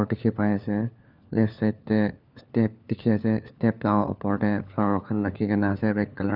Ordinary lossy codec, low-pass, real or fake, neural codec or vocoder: none; 5.4 kHz; fake; codec, 16 kHz, 4 kbps, FunCodec, trained on LibriTTS, 50 frames a second